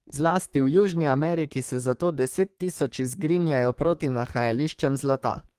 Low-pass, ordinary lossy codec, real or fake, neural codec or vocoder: 14.4 kHz; Opus, 24 kbps; fake; codec, 32 kHz, 1.9 kbps, SNAC